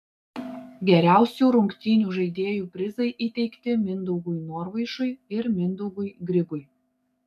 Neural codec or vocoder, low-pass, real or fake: codec, 44.1 kHz, 7.8 kbps, DAC; 14.4 kHz; fake